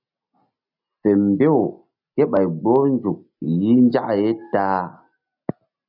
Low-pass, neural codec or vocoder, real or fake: 5.4 kHz; none; real